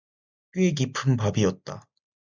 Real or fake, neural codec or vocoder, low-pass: real; none; 7.2 kHz